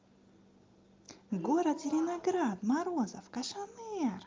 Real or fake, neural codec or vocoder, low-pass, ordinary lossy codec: real; none; 7.2 kHz; Opus, 32 kbps